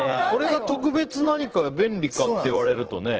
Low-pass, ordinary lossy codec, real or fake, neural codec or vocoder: 7.2 kHz; Opus, 16 kbps; fake; vocoder, 44.1 kHz, 80 mel bands, Vocos